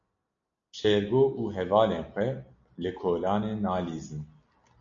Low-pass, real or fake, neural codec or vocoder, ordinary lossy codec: 7.2 kHz; real; none; MP3, 64 kbps